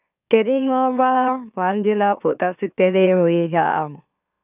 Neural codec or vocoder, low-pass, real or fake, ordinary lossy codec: autoencoder, 44.1 kHz, a latent of 192 numbers a frame, MeloTTS; 3.6 kHz; fake; none